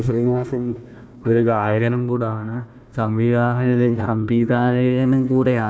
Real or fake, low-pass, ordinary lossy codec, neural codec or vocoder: fake; none; none; codec, 16 kHz, 1 kbps, FunCodec, trained on Chinese and English, 50 frames a second